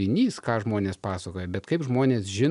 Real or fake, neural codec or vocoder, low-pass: real; none; 10.8 kHz